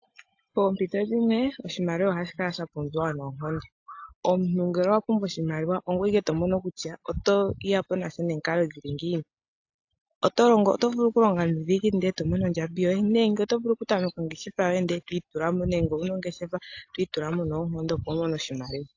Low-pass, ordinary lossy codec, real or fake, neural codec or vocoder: 7.2 kHz; AAC, 48 kbps; real; none